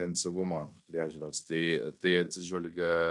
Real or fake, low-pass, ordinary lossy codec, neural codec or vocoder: fake; 10.8 kHz; MP3, 64 kbps; codec, 16 kHz in and 24 kHz out, 0.9 kbps, LongCat-Audio-Codec, fine tuned four codebook decoder